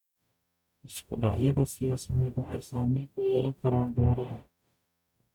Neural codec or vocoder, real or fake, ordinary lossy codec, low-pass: codec, 44.1 kHz, 0.9 kbps, DAC; fake; none; 19.8 kHz